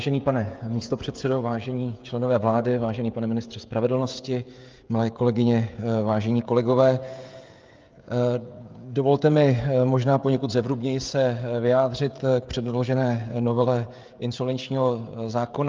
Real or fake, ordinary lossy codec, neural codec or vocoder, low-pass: fake; Opus, 24 kbps; codec, 16 kHz, 16 kbps, FreqCodec, smaller model; 7.2 kHz